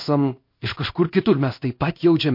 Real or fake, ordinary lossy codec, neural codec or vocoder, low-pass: fake; MP3, 32 kbps; codec, 16 kHz in and 24 kHz out, 1 kbps, XY-Tokenizer; 5.4 kHz